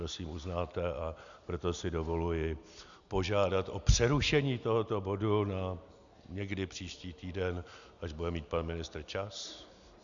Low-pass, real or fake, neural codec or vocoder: 7.2 kHz; real; none